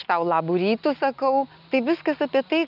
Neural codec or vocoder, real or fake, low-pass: none; real; 5.4 kHz